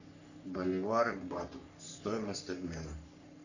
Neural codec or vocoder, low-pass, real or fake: codec, 44.1 kHz, 3.4 kbps, Pupu-Codec; 7.2 kHz; fake